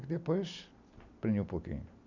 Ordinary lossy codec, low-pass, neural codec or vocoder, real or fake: none; 7.2 kHz; none; real